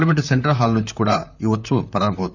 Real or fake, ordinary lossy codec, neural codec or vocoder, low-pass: fake; none; vocoder, 44.1 kHz, 128 mel bands, Pupu-Vocoder; 7.2 kHz